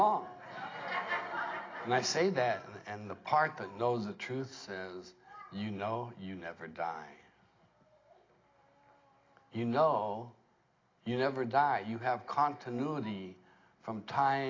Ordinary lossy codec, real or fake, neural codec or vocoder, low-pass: AAC, 32 kbps; real; none; 7.2 kHz